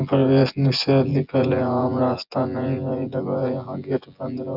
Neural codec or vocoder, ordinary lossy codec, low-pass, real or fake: vocoder, 24 kHz, 100 mel bands, Vocos; none; 5.4 kHz; fake